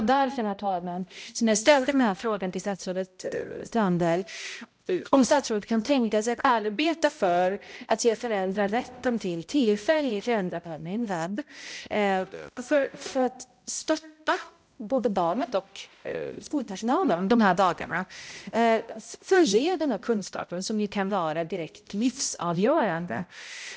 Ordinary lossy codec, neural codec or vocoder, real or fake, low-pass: none; codec, 16 kHz, 0.5 kbps, X-Codec, HuBERT features, trained on balanced general audio; fake; none